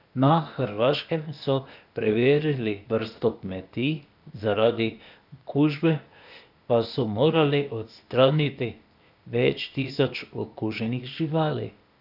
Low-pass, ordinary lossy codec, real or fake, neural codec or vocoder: 5.4 kHz; none; fake; codec, 16 kHz, 0.8 kbps, ZipCodec